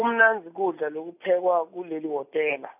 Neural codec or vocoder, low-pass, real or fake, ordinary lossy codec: none; 3.6 kHz; real; none